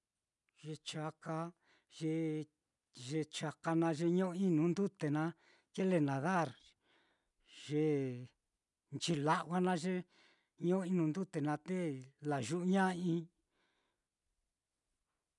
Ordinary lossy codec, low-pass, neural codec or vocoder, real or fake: none; 10.8 kHz; none; real